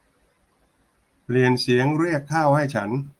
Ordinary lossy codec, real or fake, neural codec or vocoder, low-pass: Opus, 24 kbps; real; none; 19.8 kHz